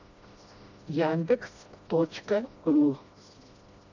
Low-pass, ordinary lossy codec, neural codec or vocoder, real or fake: 7.2 kHz; AAC, 48 kbps; codec, 16 kHz, 1 kbps, FreqCodec, smaller model; fake